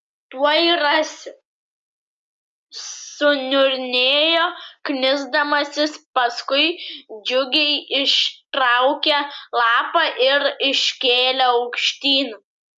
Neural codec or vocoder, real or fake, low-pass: none; real; 10.8 kHz